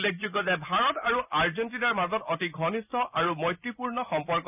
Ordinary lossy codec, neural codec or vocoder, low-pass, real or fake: none; none; 3.6 kHz; real